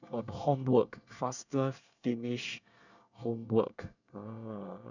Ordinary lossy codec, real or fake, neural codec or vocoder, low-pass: none; fake; codec, 24 kHz, 1 kbps, SNAC; 7.2 kHz